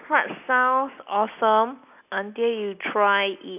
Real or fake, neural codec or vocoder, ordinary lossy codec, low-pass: real; none; none; 3.6 kHz